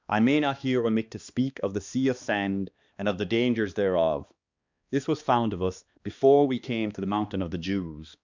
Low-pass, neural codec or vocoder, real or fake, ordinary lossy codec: 7.2 kHz; codec, 16 kHz, 2 kbps, X-Codec, HuBERT features, trained on balanced general audio; fake; Opus, 64 kbps